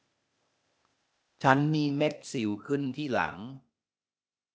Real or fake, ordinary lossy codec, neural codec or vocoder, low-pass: fake; none; codec, 16 kHz, 0.8 kbps, ZipCodec; none